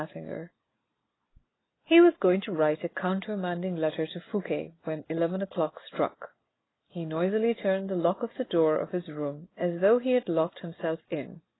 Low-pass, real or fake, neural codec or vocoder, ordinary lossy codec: 7.2 kHz; real; none; AAC, 16 kbps